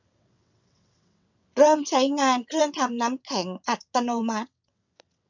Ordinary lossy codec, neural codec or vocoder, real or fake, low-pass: none; vocoder, 22.05 kHz, 80 mel bands, WaveNeXt; fake; 7.2 kHz